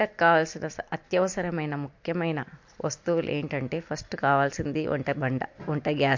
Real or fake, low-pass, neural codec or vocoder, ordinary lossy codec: real; 7.2 kHz; none; MP3, 48 kbps